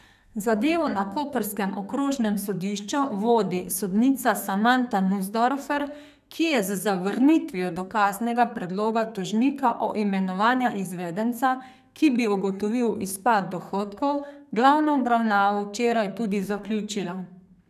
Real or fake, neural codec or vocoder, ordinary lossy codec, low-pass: fake; codec, 32 kHz, 1.9 kbps, SNAC; none; 14.4 kHz